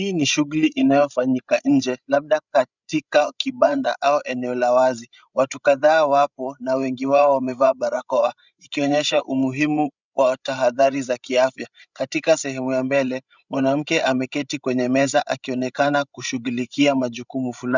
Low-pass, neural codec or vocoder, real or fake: 7.2 kHz; codec, 16 kHz, 16 kbps, FreqCodec, larger model; fake